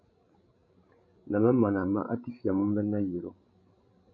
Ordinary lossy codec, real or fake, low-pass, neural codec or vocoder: MP3, 48 kbps; fake; 7.2 kHz; codec, 16 kHz, 16 kbps, FreqCodec, larger model